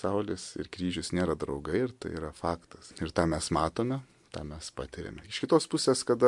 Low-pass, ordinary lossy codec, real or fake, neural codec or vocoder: 10.8 kHz; MP3, 64 kbps; real; none